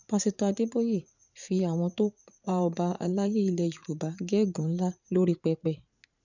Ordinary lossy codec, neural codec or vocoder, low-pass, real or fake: none; vocoder, 44.1 kHz, 80 mel bands, Vocos; 7.2 kHz; fake